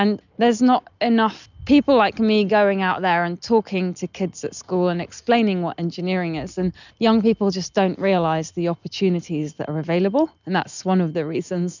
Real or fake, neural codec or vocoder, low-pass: real; none; 7.2 kHz